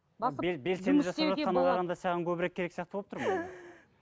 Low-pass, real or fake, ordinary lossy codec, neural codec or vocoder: none; real; none; none